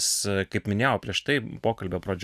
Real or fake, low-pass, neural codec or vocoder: fake; 14.4 kHz; vocoder, 44.1 kHz, 128 mel bands every 512 samples, BigVGAN v2